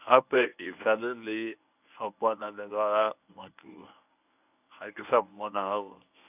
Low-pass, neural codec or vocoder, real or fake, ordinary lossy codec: 3.6 kHz; codec, 24 kHz, 0.9 kbps, WavTokenizer, medium speech release version 1; fake; none